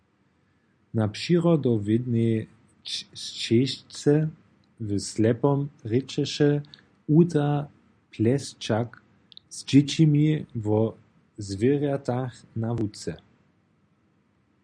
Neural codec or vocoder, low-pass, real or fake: none; 9.9 kHz; real